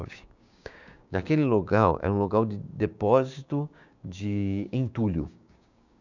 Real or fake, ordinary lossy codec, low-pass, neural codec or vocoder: fake; none; 7.2 kHz; codec, 16 kHz, 6 kbps, DAC